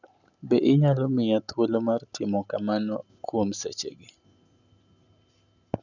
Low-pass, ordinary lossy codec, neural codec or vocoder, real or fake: 7.2 kHz; none; none; real